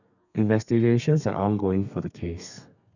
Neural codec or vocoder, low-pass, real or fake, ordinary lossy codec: codec, 32 kHz, 1.9 kbps, SNAC; 7.2 kHz; fake; none